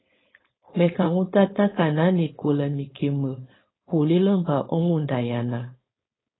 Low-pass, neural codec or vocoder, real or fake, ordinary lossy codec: 7.2 kHz; codec, 16 kHz, 4.8 kbps, FACodec; fake; AAC, 16 kbps